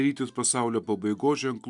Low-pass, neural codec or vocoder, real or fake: 10.8 kHz; none; real